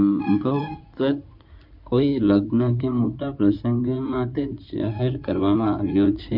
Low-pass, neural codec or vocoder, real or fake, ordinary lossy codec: 5.4 kHz; codec, 16 kHz in and 24 kHz out, 2.2 kbps, FireRedTTS-2 codec; fake; none